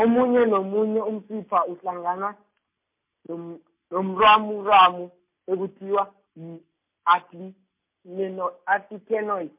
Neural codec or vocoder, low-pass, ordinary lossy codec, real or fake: none; 3.6 kHz; MP3, 32 kbps; real